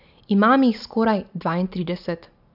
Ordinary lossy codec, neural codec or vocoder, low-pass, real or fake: none; none; 5.4 kHz; real